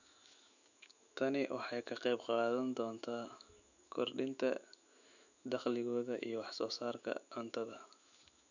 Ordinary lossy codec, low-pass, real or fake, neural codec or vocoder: none; 7.2 kHz; fake; autoencoder, 48 kHz, 128 numbers a frame, DAC-VAE, trained on Japanese speech